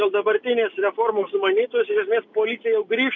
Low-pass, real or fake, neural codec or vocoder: 7.2 kHz; real; none